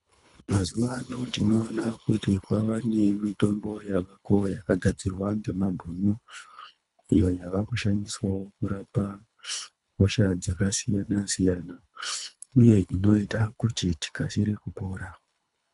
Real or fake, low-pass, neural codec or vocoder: fake; 10.8 kHz; codec, 24 kHz, 3 kbps, HILCodec